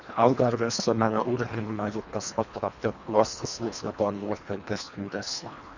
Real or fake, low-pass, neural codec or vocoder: fake; 7.2 kHz; codec, 24 kHz, 1.5 kbps, HILCodec